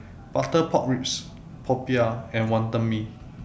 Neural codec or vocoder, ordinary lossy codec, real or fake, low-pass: none; none; real; none